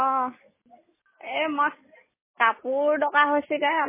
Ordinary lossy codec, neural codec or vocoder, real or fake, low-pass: MP3, 16 kbps; none; real; 3.6 kHz